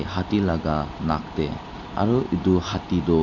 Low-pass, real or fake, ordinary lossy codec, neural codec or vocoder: 7.2 kHz; real; none; none